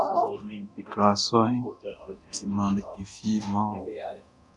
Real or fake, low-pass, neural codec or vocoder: fake; 10.8 kHz; codec, 24 kHz, 0.9 kbps, DualCodec